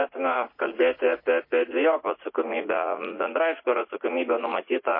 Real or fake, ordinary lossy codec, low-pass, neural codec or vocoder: fake; MP3, 24 kbps; 5.4 kHz; vocoder, 44.1 kHz, 80 mel bands, Vocos